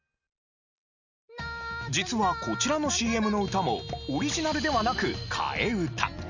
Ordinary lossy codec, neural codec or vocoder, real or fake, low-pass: none; none; real; 7.2 kHz